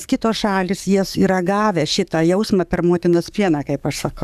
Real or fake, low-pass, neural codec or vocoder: fake; 14.4 kHz; codec, 44.1 kHz, 7.8 kbps, DAC